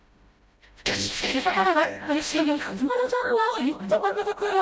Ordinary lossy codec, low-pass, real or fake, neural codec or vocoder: none; none; fake; codec, 16 kHz, 0.5 kbps, FreqCodec, smaller model